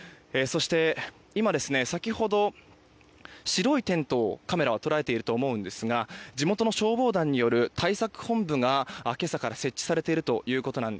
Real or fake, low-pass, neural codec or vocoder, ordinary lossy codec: real; none; none; none